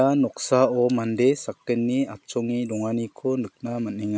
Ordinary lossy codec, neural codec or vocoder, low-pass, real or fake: none; none; none; real